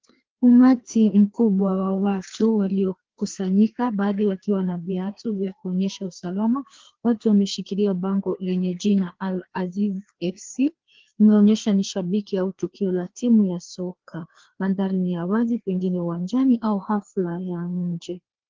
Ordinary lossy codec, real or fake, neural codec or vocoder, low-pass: Opus, 16 kbps; fake; codec, 16 kHz, 2 kbps, FreqCodec, larger model; 7.2 kHz